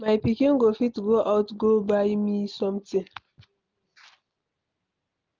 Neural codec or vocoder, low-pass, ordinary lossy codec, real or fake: none; 7.2 kHz; Opus, 24 kbps; real